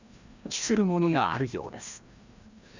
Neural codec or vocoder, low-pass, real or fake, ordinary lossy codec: codec, 16 kHz, 1 kbps, FreqCodec, larger model; 7.2 kHz; fake; Opus, 64 kbps